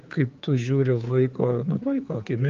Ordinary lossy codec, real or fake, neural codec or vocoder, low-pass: Opus, 24 kbps; fake; codec, 16 kHz, 4 kbps, X-Codec, HuBERT features, trained on general audio; 7.2 kHz